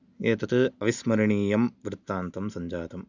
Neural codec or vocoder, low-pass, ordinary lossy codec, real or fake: none; 7.2 kHz; none; real